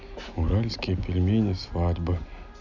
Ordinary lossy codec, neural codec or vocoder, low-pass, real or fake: none; none; 7.2 kHz; real